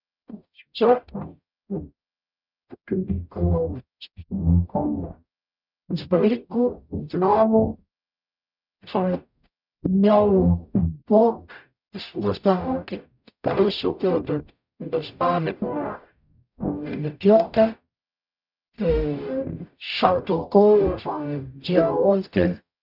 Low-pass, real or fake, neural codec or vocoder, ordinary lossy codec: 5.4 kHz; fake; codec, 44.1 kHz, 0.9 kbps, DAC; none